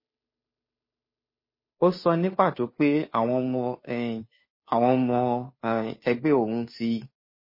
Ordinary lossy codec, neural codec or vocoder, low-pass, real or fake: MP3, 24 kbps; codec, 16 kHz, 2 kbps, FunCodec, trained on Chinese and English, 25 frames a second; 5.4 kHz; fake